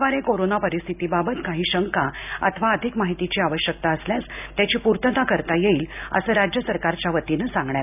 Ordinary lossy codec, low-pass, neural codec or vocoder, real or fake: none; 3.6 kHz; none; real